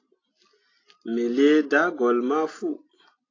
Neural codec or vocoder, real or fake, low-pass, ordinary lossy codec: none; real; 7.2 kHz; AAC, 32 kbps